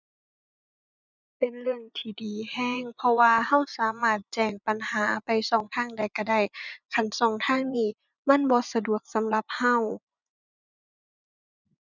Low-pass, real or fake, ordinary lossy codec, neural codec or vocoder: 7.2 kHz; fake; none; vocoder, 24 kHz, 100 mel bands, Vocos